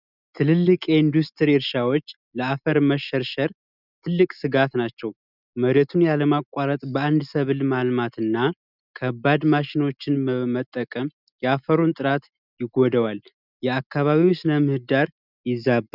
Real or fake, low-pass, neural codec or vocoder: real; 5.4 kHz; none